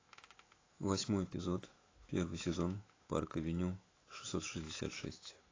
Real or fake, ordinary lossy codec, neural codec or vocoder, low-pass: fake; AAC, 32 kbps; autoencoder, 48 kHz, 128 numbers a frame, DAC-VAE, trained on Japanese speech; 7.2 kHz